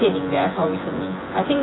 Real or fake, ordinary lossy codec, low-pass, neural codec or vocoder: fake; AAC, 16 kbps; 7.2 kHz; vocoder, 24 kHz, 100 mel bands, Vocos